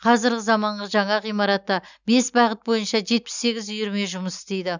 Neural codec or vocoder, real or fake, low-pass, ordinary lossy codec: none; real; 7.2 kHz; none